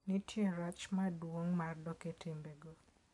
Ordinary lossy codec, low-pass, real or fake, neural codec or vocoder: none; 10.8 kHz; real; none